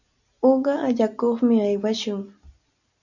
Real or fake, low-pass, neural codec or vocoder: real; 7.2 kHz; none